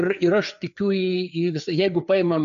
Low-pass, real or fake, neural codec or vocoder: 7.2 kHz; fake; codec, 16 kHz, 4 kbps, FreqCodec, larger model